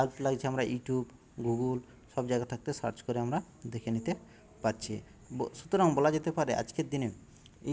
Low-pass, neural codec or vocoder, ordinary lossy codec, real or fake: none; none; none; real